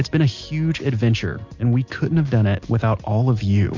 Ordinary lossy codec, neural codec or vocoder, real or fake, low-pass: MP3, 48 kbps; none; real; 7.2 kHz